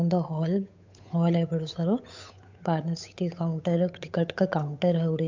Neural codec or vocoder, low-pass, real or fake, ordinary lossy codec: codec, 16 kHz, 8 kbps, FunCodec, trained on Chinese and English, 25 frames a second; 7.2 kHz; fake; MP3, 64 kbps